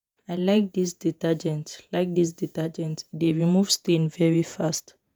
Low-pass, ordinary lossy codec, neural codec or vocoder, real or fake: none; none; vocoder, 48 kHz, 128 mel bands, Vocos; fake